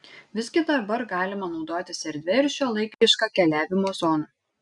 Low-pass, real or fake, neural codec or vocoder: 10.8 kHz; real; none